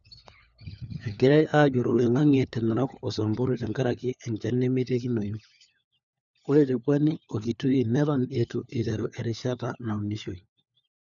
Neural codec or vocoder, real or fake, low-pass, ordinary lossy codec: codec, 16 kHz, 4 kbps, FunCodec, trained on LibriTTS, 50 frames a second; fake; 7.2 kHz; none